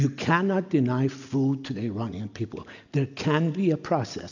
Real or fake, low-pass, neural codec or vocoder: real; 7.2 kHz; none